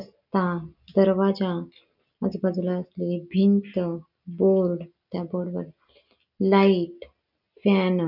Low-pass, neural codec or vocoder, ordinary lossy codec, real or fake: 5.4 kHz; none; none; real